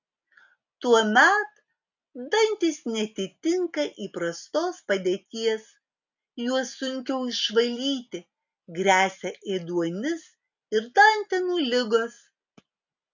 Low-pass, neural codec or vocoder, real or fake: 7.2 kHz; none; real